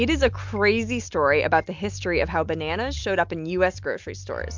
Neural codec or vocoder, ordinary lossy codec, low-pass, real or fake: none; MP3, 64 kbps; 7.2 kHz; real